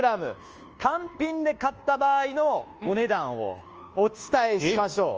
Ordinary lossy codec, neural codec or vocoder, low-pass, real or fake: Opus, 24 kbps; codec, 24 kHz, 1.2 kbps, DualCodec; 7.2 kHz; fake